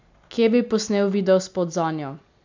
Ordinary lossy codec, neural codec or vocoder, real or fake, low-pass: MP3, 64 kbps; none; real; 7.2 kHz